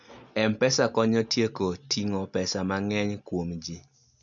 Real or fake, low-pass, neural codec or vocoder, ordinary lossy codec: real; 7.2 kHz; none; none